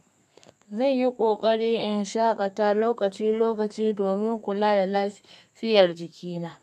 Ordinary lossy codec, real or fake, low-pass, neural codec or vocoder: none; fake; 14.4 kHz; codec, 32 kHz, 1.9 kbps, SNAC